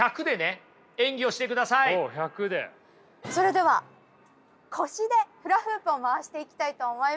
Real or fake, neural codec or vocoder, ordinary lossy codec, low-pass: real; none; none; none